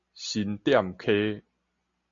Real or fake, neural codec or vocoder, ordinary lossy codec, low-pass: real; none; MP3, 64 kbps; 7.2 kHz